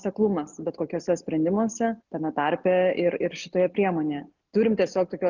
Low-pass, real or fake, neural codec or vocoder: 7.2 kHz; real; none